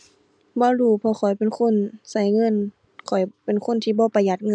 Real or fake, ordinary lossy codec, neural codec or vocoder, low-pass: real; none; none; 9.9 kHz